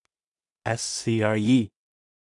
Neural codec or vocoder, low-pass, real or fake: codec, 16 kHz in and 24 kHz out, 0.4 kbps, LongCat-Audio-Codec, two codebook decoder; 10.8 kHz; fake